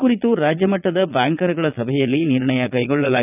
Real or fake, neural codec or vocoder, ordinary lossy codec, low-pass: fake; vocoder, 22.05 kHz, 80 mel bands, Vocos; none; 3.6 kHz